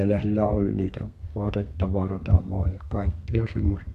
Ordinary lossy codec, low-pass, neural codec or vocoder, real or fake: none; 14.4 kHz; codec, 32 kHz, 1.9 kbps, SNAC; fake